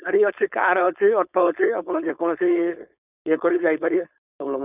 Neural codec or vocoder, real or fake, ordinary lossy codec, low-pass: codec, 16 kHz, 4.8 kbps, FACodec; fake; none; 3.6 kHz